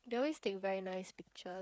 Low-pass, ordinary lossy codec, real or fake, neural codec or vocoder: none; none; fake; codec, 16 kHz, 8 kbps, FreqCodec, smaller model